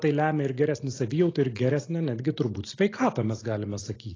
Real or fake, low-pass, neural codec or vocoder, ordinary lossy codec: real; 7.2 kHz; none; AAC, 32 kbps